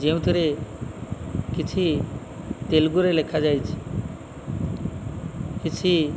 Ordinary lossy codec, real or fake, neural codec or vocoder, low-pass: none; real; none; none